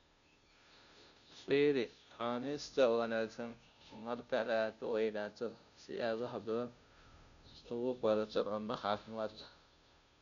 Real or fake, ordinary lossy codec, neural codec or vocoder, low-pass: fake; none; codec, 16 kHz, 0.5 kbps, FunCodec, trained on Chinese and English, 25 frames a second; 7.2 kHz